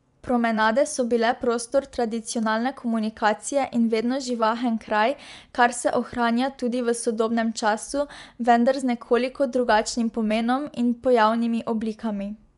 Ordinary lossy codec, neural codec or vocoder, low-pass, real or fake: none; vocoder, 24 kHz, 100 mel bands, Vocos; 10.8 kHz; fake